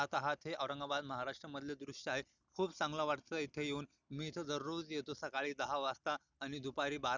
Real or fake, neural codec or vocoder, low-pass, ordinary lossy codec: real; none; 7.2 kHz; none